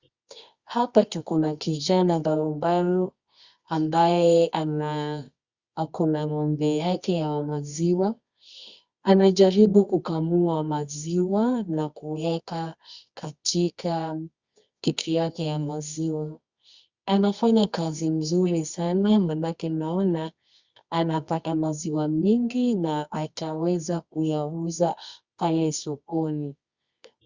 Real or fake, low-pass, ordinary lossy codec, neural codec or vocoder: fake; 7.2 kHz; Opus, 64 kbps; codec, 24 kHz, 0.9 kbps, WavTokenizer, medium music audio release